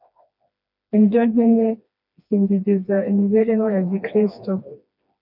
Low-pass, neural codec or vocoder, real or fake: 5.4 kHz; codec, 16 kHz, 2 kbps, FreqCodec, smaller model; fake